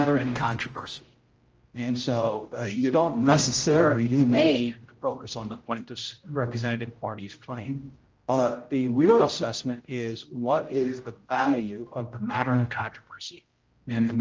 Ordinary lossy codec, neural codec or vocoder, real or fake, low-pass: Opus, 24 kbps; codec, 16 kHz, 0.5 kbps, X-Codec, HuBERT features, trained on general audio; fake; 7.2 kHz